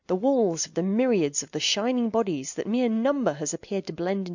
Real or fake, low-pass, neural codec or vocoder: real; 7.2 kHz; none